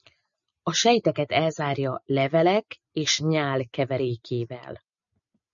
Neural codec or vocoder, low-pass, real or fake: none; 7.2 kHz; real